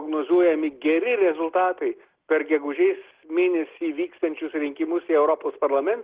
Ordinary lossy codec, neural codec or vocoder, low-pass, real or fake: Opus, 16 kbps; none; 3.6 kHz; real